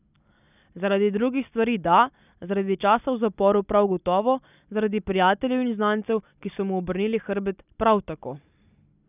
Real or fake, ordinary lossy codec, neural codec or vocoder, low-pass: real; none; none; 3.6 kHz